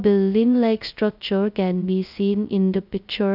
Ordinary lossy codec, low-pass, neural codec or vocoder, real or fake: none; 5.4 kHz; codec, 16 kHz, 0.2 kbps, FocalCodec; fake